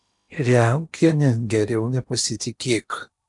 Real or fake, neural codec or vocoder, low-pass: fake; codec, 16 kHz in and 24 kHz out, 0.8 kbps, FocalCodec, streaming, 65536 codes; 10.8 kHz